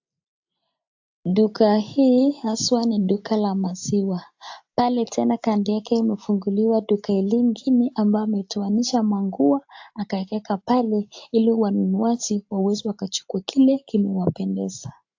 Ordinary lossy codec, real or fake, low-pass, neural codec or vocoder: AAC, 48 kbps; real; 7.2 kHz; none